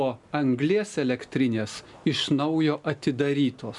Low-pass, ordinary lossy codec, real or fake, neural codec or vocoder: 10.8 kHz; MP3, 96 kbps; real; none